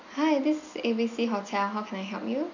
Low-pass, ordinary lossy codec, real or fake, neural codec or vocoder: 7.2 kHz; none; real; none